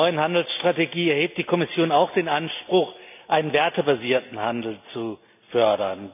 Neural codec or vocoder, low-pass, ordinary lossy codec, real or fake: none; 3.6 kHz; none; real